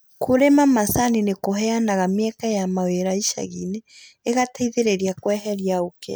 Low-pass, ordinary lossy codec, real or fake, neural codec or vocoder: none; none; real; none